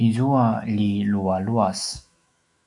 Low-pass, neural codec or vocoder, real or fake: 10.8 kHz; autoencoder, 48 kHz, 128 numbers a frame, DAC-VAE, trained on Japanese speech; fake